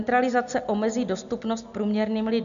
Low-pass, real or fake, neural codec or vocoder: 7.2 kHz; real; none